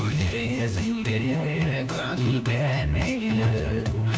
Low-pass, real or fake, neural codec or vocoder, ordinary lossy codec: none; fake; codec, 16 kHz, 1 kbps, FunCodec, trained on LibriTTS, 50 frames a second; none